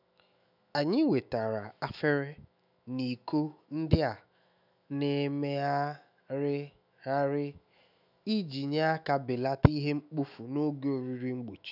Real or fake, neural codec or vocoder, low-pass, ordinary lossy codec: fake; autoencoder, 48 kHz, 128 numbers a frame, DAC-VAE, trained on Japanese speech; 5.4 kHz; none